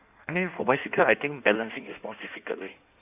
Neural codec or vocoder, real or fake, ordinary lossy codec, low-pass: codec, 16 kHz in and 24 kHz out, 1.1 kbps, FireRedTTS-2 codec; fake; AAC, 32 kbps; 3.6 kHz